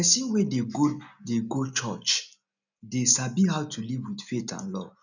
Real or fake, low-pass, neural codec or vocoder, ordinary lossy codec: real; 7.2 kHz; none; none